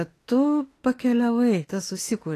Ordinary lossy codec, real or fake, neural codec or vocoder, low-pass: AAC, 48 kbps; fake; autoencoder, 48 kHz, 32 numbers a frame, DAC-VAE, trained on Japanese speech; 14.4 kHz